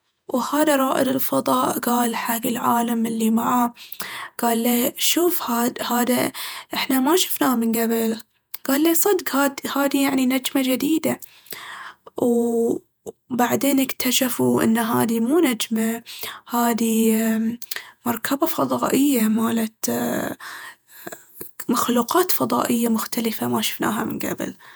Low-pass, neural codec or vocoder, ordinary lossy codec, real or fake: none; vocoder, 48 kHz, 128 mel bands, Vocos; none; fake